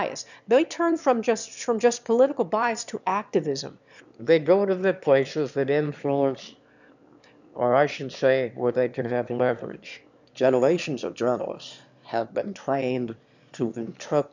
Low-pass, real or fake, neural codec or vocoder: 7.2 kHz; fake; autoencoder, 22.05 kHz, a latent of 192 numbers a frame, VITS, trained on one speaker